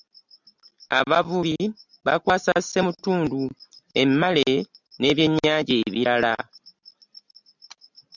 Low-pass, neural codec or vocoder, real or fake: 7.2 kHz; none; real